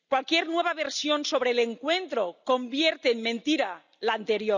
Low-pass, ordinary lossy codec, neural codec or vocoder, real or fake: 7.2 kHz; none; none; real